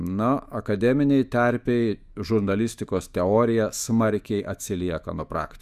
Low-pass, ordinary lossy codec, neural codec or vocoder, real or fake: 14.4 kHz; Opus, 64 kbps; autoencoder, 48 kHz, 128 numbers a frame, DAC-VAE, trained on Japanese speech; fake